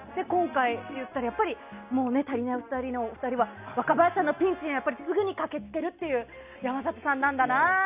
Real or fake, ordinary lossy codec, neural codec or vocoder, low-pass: real; Opus, 64 kbps; none; 3.6 kHz